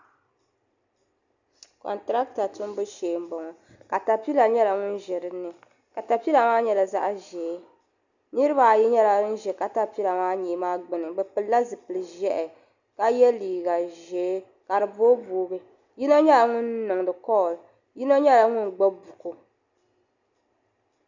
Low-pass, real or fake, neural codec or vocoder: 7.2 kHz; real; none